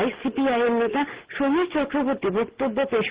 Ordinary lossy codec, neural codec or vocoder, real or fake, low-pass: Opus, 16 kbps; none; real; 3.6 kHz